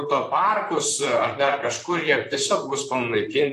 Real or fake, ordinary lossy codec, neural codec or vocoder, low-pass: fake; AAC, 48 kbps; vocoder, 44.1 kHz, 128 mel bands, Pupu-Vocoder; 14.4 kHz